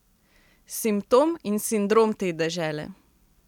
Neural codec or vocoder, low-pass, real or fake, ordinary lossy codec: none; 19.8 kHz; real; none